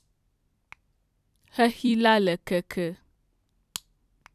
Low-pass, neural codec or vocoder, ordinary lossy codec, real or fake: 14.4 kHz; vocoder, 44.1 kHz, 128 mel bands every 256 samples, BigVGAN v2; none; fake